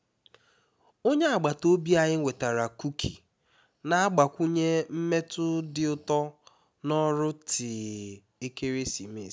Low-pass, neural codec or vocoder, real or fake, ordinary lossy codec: none; none; real; none